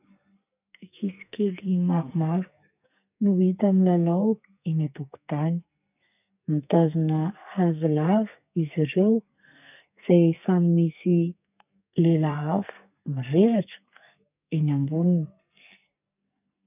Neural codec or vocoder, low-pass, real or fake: codec, 44.1 kHz, 2.6 kbps, SNAC; 3.6 kHz; fake